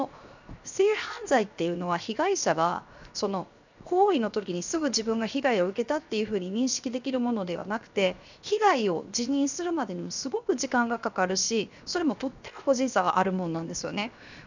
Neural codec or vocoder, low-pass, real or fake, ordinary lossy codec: codec, 16 kHz, 0.7 kbps, FocalCodec; 7.2 kHz; fake; none